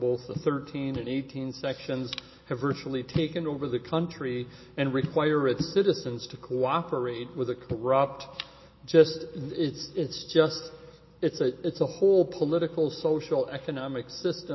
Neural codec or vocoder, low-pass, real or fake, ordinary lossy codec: none; 7.2 kHz; real; MP3, 24 kbps